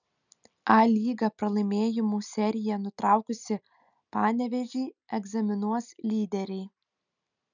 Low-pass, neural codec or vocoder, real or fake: 7.2 kHz; none; real